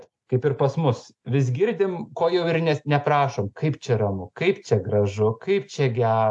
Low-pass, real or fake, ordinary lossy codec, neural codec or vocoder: 10.8 kHz; real; AAC, 64 kbps; none